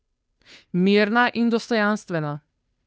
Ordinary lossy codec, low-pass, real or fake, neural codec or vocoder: none; none; fake; codec, 16 kHz, 2 kbps, FunCodec, trained on Chinese and English, 25 frames a second